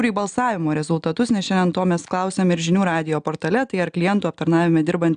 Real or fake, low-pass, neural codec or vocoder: real; 9.9 kHz; none